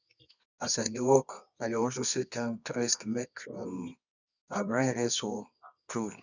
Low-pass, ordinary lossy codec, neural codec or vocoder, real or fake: 7.2 kHz; none; codec, 24 kHz, 0.9 kbps, WavTokenizer, medium music audio release; fake